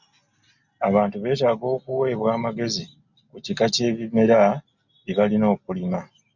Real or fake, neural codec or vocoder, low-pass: real; none; 7.2 kHz